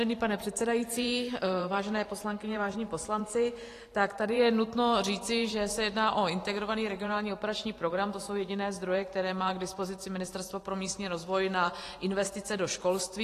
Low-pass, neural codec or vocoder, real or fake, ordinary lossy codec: 14.4 kHz; vocoder, 44.1 kHz, 128 mel bands every 512 samples, BigVGAN v2; fake; AAC, 48 kbps